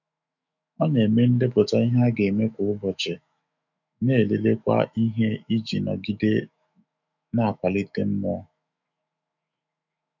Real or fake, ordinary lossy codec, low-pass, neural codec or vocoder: fake; none; 7.2 kHz; autoencoder, 48 kHz, 128 numbers a frame, DAC-VAE, trained on Japanese speech